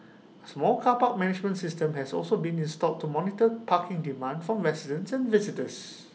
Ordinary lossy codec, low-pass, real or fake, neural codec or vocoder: none; none; real; none